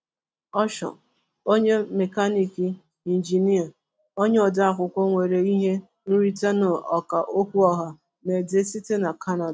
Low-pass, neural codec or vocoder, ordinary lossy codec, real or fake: none; none; none; real